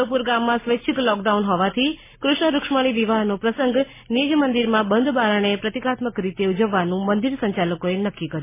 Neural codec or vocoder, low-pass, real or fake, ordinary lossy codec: none; 3.6 kHz; real; MP3, 16 kbps